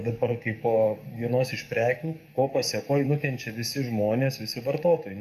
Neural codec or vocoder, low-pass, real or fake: codec, 44.1 kHz, 7.8 kbps, DAC; 14.4 kHz; fake